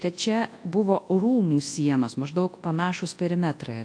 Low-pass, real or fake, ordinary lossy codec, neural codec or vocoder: 9.9 kHz; fake; AAC, 48 kbps; codec, 24 kHz, 0.9 kbps, WavTokenizer, large speech release